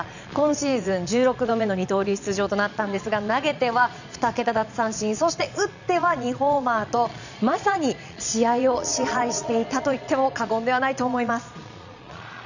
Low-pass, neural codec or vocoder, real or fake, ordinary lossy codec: 7.2 kHz; vocoder, 22.05 kHz, 80 mel bands, Vocos; fake; none